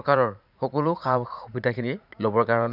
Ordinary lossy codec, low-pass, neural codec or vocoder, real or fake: none; 5.4 kHz; vocoder, 44.1 kHz, 80 mel bands, Vocos; fake